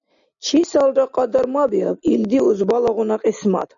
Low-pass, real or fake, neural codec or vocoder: 7.2 kHz; real; none